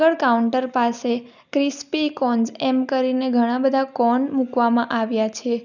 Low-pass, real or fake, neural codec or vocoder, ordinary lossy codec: 7.2 kHz; real; none; none